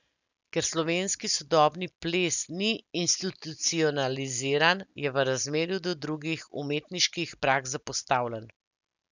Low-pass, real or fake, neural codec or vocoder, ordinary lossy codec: 7.2 kHz; real; none; none